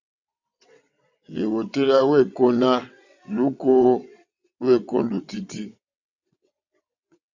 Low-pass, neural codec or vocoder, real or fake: 7.2 kHz; vocoder, 22.05 kHz, 80 mel bands, WaveNeXt; fake